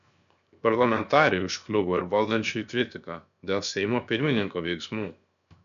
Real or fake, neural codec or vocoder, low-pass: fake; codec, 16 kHz, 0.7 kbps, FocalCodec; 7.2 kHz